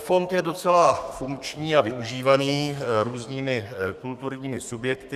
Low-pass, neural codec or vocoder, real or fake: 14.4 kHz; codec, 32 kHz, 1.9 kbps, SNAC; fake